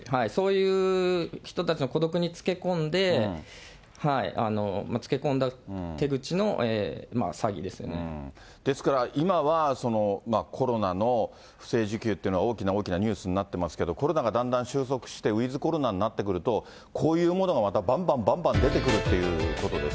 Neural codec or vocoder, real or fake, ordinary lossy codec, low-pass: none; real; none; none